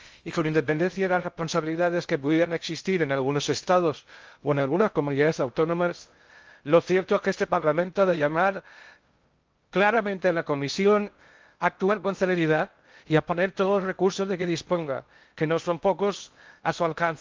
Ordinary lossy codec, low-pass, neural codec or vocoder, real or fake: Opus, 24 kbps; 7.2 kHz; codec, 16 kHz in and 24 kHz out, 0.6 kbps, FocalCodec, streaming, 2048 codes; fake